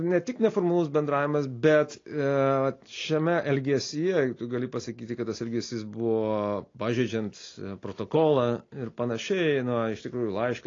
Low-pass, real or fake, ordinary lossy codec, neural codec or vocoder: 7.2 kHz; real; AAC, 32 kbps; none